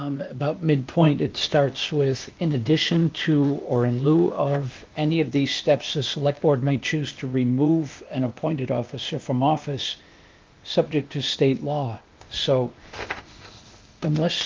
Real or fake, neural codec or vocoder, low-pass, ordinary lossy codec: fake; codec, 16 kHz, 0.8 kbps, ZipCodec; 7.2 kHz; Opus, 24 kbps